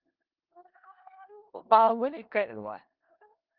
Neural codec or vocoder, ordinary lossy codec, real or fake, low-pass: codec, 16 kHz in and 24 kHz out, 0.4 kbps, LongCat-Audio-Codec, four codebook decoder; Opus, 32 kbps; fake; 5.4 kHz